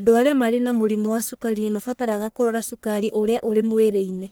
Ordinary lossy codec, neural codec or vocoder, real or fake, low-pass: none; codec, 44.1 kHz, 1.7 kbps, Pupu-Codec; fake; none